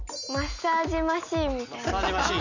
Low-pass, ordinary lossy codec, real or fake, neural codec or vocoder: 7.2 kHz; none; real; none